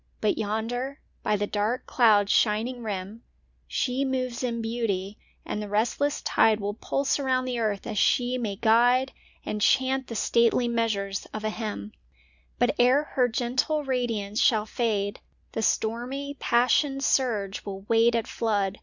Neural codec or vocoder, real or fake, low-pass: none; real; 7.2 kHz